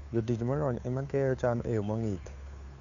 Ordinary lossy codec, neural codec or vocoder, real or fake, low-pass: none; codec, 16 kHz, 2 kbps, FunCodec, trained on Chinese and English, 25 frames a second; fake; 7.2 kHz